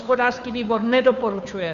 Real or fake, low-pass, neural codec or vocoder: fake; 7.2 kHz; codec, 16 kHz, 2 kbps, FunCodec, trained on Chinese and English, 25 frames a second